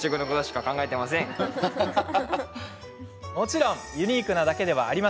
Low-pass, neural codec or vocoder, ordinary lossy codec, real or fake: none; none; none; real